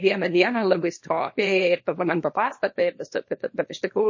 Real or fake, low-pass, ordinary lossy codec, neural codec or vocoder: fake; 7.2 kHz; MP3, 32 kbps; codec, 24 kHz, 0.9 kbps, WavTokenizer, small release